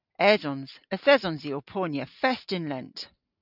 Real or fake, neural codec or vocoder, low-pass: real; none; 5.4 kHz